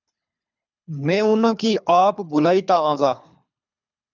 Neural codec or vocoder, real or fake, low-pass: codec, 24 kHz, 3 kbps, HILCodec; fake; 7.2 kHz